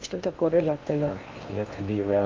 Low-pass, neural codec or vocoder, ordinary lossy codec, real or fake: 7.2 kHz; codec, 16 kHz in and 24 kHz out, 0.6 kbps, FocalCodec, streaming, 2048 codes; Opus, 32 kbps; fake